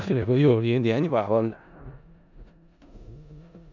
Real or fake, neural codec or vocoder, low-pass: fake; codec, 16 kHz in and 24 kHz out, 0.4 kbps, LongCat-Audio-Codec, four codebook decoder; 7.2 kHz